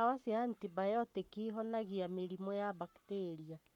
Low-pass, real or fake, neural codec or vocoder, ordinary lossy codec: 19.8 kHz; fake; codec, 44.1 kHz, 7.8 kbps, Pupu-Codec; none